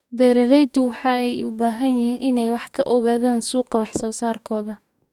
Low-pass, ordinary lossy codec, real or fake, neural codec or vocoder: 19.8 kHz; none; fake; codec, 44.1 kHz, 2.6 kbps, DAC